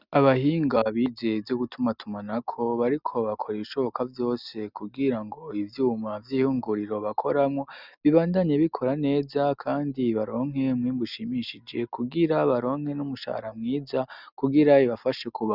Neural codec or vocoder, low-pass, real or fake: none; 5.4 kHz; real